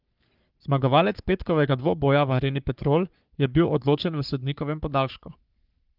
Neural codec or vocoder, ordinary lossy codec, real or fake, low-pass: codec, 44.1 kHz, 3.4 kbps, Pupu-Codec; Opus, 32 kbps; fake; 5.4 kHz